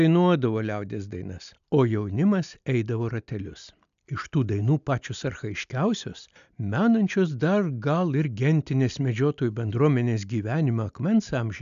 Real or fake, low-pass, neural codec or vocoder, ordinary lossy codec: real; 7.2 kHz; none; AAC, 96 kbps